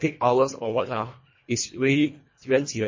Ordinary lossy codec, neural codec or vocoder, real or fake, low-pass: MP3, 32 kbps; codec, 24 kHz, 1.5 kbps, HILCodec; fake; 7.2 kHz